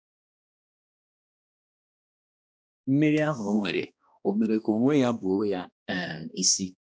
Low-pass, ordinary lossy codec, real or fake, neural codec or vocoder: none; none; fake; codec, 16 kHz, 1 kbps, X-Codec, HuBERT features, trained on balanced general audio